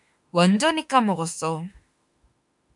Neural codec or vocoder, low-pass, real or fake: autoencoder, 48 kHz, 32 numbers a frame, DAC-VAE, trained on Japanese speech; 10.8 kHz; fake